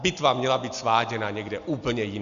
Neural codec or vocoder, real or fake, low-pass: none; real; 7.2 kHz